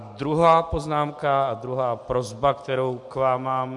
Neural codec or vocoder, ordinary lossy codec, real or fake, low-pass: none; MP3, 64 kbps; real; 10.8 kHz